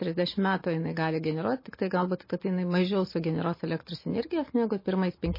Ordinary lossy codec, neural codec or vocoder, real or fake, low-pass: MP3, 24 kbps; none; real; 5.4 kHz